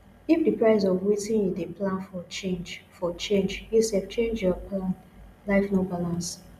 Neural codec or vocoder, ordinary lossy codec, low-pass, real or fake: none; none; 14.4 kHz; real